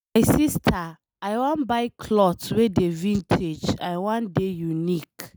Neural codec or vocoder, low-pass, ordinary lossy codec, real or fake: none; none; none; real